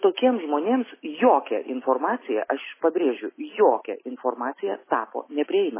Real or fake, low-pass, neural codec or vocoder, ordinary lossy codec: real; 3.6 kHz; none; MP3, 16 kbps